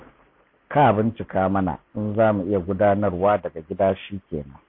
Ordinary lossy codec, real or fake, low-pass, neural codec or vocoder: MP3, 32 kbps; real; 5.4 kHz; none